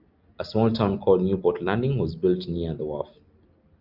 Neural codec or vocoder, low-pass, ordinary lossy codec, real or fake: none; 5.4 kHz; Opus, 32 kbps; real